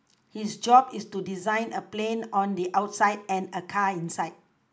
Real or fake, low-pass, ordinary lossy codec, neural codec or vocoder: real; none; none; none